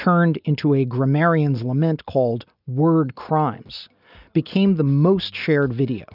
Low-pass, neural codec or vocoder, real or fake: 5.4 kHz; none; real